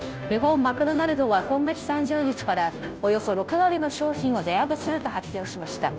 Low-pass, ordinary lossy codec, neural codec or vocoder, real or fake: none; none; codec, 16 kHz, 0.5 kbps, FunCodec, trained on Chinese and English, 25 frames a second; fake